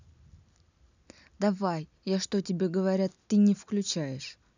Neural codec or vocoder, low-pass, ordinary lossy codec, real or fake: none; 7.2 kHz; none; real